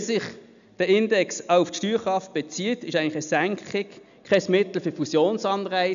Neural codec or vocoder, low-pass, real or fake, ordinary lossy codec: none; 7.2 kHz; real; MP3, 96 kbps